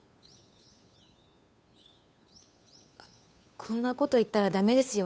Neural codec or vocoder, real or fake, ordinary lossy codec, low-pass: codec, 16 kHz, 2 kbps, FunCodec, trained on Chinese and English, 25 frames a second; fake; none; none